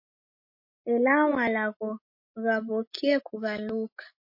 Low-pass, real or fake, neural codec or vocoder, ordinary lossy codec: 5.4 kHz; real; none; MP3, 32 kbps